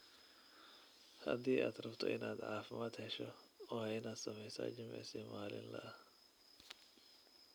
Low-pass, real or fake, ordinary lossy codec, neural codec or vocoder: none; real; none; none